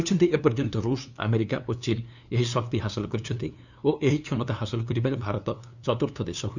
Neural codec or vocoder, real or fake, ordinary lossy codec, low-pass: codec, 16 kHz, 2 kbps, FunCodec, trained on LibriTTS, 25 frames a second; fake; none; 7.2 kHz